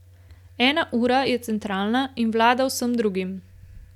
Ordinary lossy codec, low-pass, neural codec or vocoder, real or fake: none; 19.8 kHz; none; real